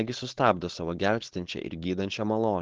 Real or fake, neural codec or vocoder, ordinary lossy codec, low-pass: fake; codec, 16 kHz, 4.8 kbps, FACodec; Opus, 16 kbps; 7.2 kHz